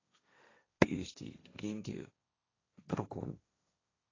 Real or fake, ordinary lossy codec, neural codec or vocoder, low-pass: fake; AAC, 32 kbps; codec, 16 kHz, 1.1 kbps, Voila-Tokenizer; 7.2 kHz